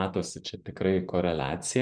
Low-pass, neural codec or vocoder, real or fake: 9.9 kHz; vocoder, 24 kHz, 100 mel bands, Vocos; fake